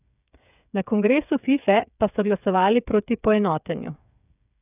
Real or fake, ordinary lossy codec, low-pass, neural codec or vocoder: fake; none; 3.6 kHz; codec, 16 kHz, 8 kbps, FreqCodec, smaller model